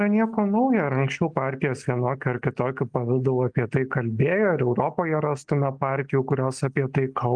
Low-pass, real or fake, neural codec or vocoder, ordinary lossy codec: 9.9 kHz; real; none; Opus, 32 kbps